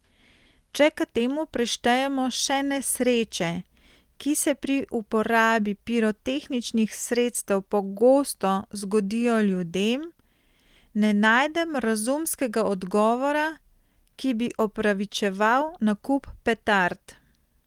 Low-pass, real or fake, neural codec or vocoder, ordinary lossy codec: 19.8 kHz; real; none; Opus, 24 kbps